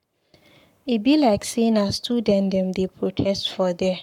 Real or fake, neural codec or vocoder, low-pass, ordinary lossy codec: fake; codec, 44.1 kHz, 7.8 kbps, Pupu-Codec; 19.8 kHz; MP3, 96 kbps